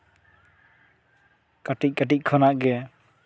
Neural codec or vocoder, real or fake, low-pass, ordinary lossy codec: none; real; none; none